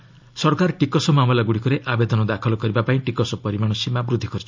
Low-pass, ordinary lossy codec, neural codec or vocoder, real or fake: 7.2 kHz; MP3, 64 kbps; none; real